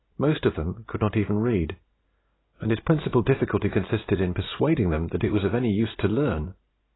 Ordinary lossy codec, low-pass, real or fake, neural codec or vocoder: AAC, 16 kbps; 7.2 kHz; real; none